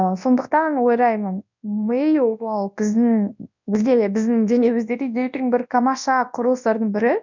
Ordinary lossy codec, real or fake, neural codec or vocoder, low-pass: none; fake; codec, 24 kHz, 0.9 kbps, WavTokenizer, large speech release; 7.2 kHz